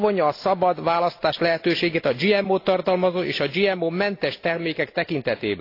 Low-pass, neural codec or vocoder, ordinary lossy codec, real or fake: 5.4 kHz; none; AAC, 32 kbps; real